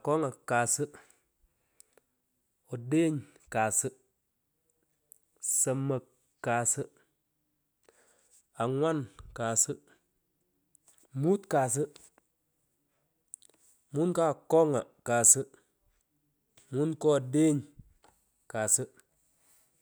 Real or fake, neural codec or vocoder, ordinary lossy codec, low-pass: real; none; none; none